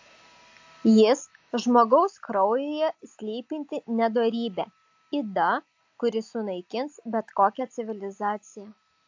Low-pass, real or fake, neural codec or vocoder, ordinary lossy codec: 7.2 kHz; real; none; AAC, 48 kbps